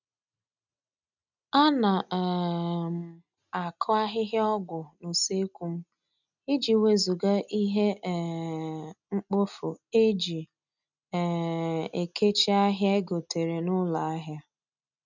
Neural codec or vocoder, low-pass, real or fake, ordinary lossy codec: none; 7.2 kHz; real; none